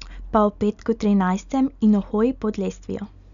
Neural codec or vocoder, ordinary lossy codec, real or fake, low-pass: none; none; real; 7.2 kHz